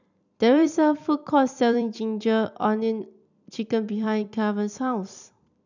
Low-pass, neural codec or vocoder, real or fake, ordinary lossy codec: 7.2 kHz; vocoder, 44.1 kHz, 128 mel bands every 256 samples, BigVGAN v2; fake; none